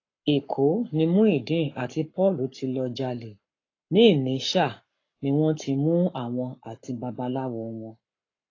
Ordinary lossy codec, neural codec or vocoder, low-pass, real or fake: AAC, 32 kbps; codec, 44.1 kHz, 7.8 kbps, Pupu-Codec; 7.2 kHz; fake